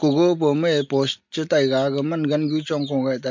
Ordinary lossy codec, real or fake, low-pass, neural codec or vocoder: MP3, 48 kbps; real; 7.2 kHz; none